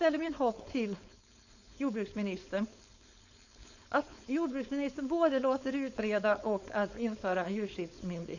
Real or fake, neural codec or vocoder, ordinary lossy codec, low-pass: fake; codec, 16 kHz, 4.8 kbps, FACodec; none; 7.2 kHz